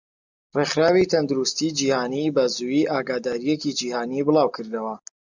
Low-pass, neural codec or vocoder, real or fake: 7.2 kHz; none; real